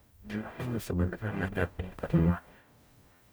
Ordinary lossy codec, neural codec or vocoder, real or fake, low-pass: none; codec, 44.1 kHz, 0.9 kbps, DAC; fake; none